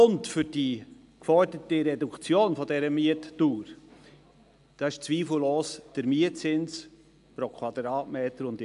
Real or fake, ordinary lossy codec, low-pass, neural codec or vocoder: real; AAC, 96 kbps; 10.8 kHz; none